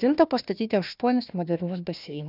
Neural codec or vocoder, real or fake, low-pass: codec, 16 kHz, 1 kbps, FunCodec, trained on Chinese and English, 50 frames a second; fake; 5.4 kHz